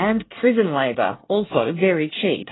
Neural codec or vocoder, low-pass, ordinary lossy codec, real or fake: codec, 24 kHz, 1 kbps, SNAC; 7.2 kHz; AAC, 16 kbps; fake